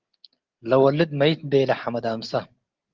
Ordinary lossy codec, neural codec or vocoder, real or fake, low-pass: Opus, 16 kbps; none; real; 7.2 kHz